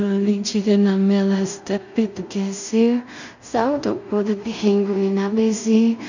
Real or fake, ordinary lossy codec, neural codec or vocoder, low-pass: fake; none; codec, 16 kHz in and 24 kHz out, 0.4 kbps, LongCat-Audio-Codec, two codebook decoder; 7.2 kHz